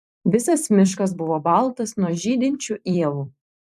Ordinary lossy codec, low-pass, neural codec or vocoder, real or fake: AAC, 96 kbps; 14.4 kHz; vocoder, 44.1 kHz, 128 mel bands every 256 samples, BigVGAN v2; fake